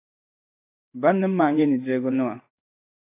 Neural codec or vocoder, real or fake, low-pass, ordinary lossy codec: codec, 16 kHz in and 24 kHz out, 1 kbps, XY-Tokenizer; fake; 3.6 kHz; AAC, 24 kbps